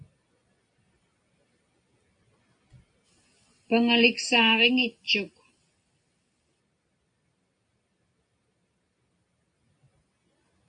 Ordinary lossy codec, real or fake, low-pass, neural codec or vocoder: MP3, 48 kbps; real; 9.9 kHz; none